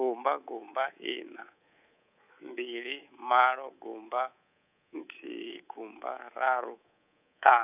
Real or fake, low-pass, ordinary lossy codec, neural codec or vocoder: fake; 3.6 kHz; none; codec, 24 kHz, 3.1 kbps, DualCodec